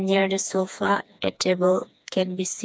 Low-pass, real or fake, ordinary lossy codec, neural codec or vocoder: none; fake; none; codec, 16 kHz, 2 kbps, FreqCodec, smaller model